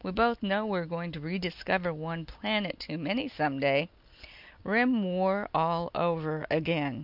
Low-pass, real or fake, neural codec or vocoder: 5.4 kHz; real; none